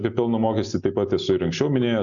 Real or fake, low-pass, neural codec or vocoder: real; 7.2 kHz; none